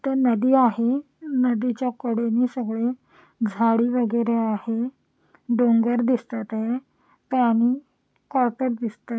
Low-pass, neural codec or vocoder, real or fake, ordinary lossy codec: none; none; real; none